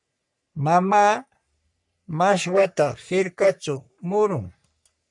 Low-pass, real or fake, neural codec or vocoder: 10.8 kHz; fake; codec, 44.1 kHz, 3.4 kbps, Pupu-Codec